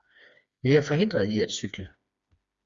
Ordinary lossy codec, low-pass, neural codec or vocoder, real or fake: Opus, 64 kbps; 7.2 kHz; codec, 16 kHz, 2 kbps, FreqCodec, smaller model; fake